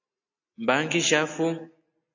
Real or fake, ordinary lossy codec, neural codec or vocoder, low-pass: real; AAC, 48 kbps; none; 7.2 kHz